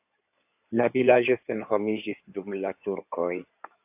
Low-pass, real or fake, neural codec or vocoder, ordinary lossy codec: 3.6 kHz; fake; codec, 16 kHz in and 24 kHz out, 2.2 kbps, FireRedTTS-2 codec; AAC, 32 kbps